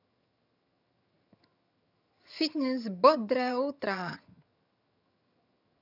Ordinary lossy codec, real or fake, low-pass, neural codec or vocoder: none; fake; 5.4 kHz; vocoder, 22.05 kHz, 80 mel bands, HiFi-GAN